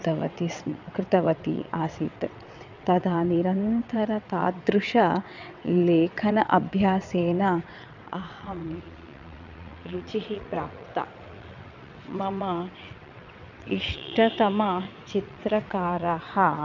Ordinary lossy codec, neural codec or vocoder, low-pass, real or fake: none; vocoder, 22.05 kHz, 80 mel bands, WaveNeXt; 7.2 kHz; fake